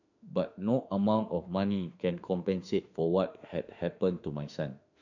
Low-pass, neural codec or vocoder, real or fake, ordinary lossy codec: 7.2 kHz; autoencoder, 48 kHz, 32 numbers a frame, DAC-VAE, trained on Japanese speech; fake; none